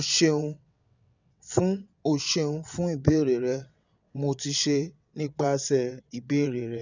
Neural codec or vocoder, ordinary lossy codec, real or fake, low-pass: vocoder, 22.05 kHz, 80 mel bands, WaveNeXt; none; fake; 7.2 kHz